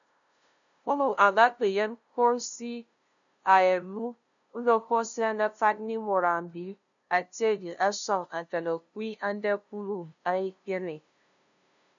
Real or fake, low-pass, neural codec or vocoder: fake; 7.2 kHz; codec, 16 kHz, 0.5 kbps, FunCodec, trained on LibriTTS, 25 frames a second